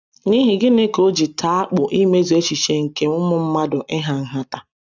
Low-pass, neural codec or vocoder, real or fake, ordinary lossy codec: 7.2 kHz; none; real; none